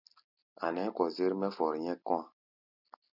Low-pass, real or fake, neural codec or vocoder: 5.4 kHz; real; none